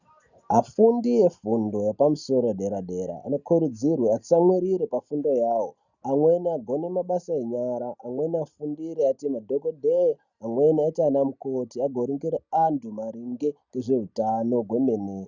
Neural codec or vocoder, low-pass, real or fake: none; 7.2 kHz; real